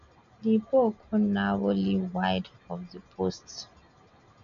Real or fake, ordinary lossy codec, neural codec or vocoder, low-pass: real; MP3, 96 kbps; none; 7.2 kHz